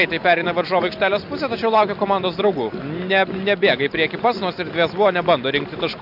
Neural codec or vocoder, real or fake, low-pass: none; real; 5.4 kHz